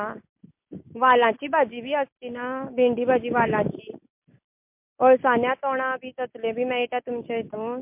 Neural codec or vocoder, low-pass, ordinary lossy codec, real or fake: none; 3.6 kHz; MP3, 32 kbps; real